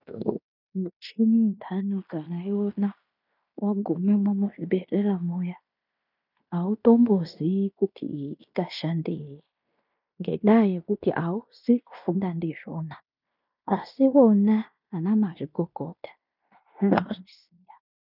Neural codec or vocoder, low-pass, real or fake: codec, 16 kHz in and 24 kHz out, 0.9 kbps, LongCat-Audio-Codec, fine tuned four codebook decoder; 5.4 kHz; fake